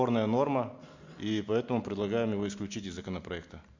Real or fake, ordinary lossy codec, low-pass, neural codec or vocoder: real; MP3, 48 kbps; 7.2 kHz; none